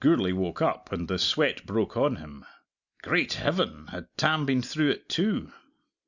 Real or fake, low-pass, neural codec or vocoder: real; 7.2 kHz; none